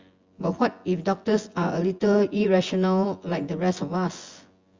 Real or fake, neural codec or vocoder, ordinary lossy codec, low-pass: fake; vocoder, 24 kHz, 100 mel bands, Vocos; Opus, 32 kbps; 7.2 kHz